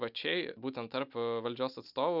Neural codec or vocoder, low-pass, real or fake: none; 5.4 kHz; real